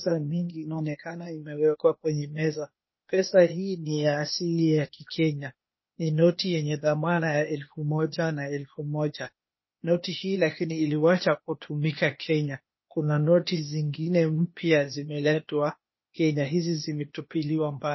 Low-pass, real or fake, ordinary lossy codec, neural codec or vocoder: 7.2 kHz; fake; MP3, 24 kbps; codec, 16 kHz, 0.8 kbps, ZipCodec